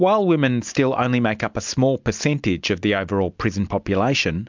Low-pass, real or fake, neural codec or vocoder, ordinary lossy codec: 7.2 kHz; real; none; MP3, 64 kbps